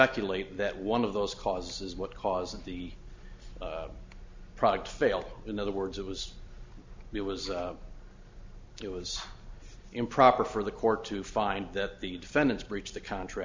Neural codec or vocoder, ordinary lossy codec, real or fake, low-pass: none; MP3, 64 kbps; real; 7.2 kHz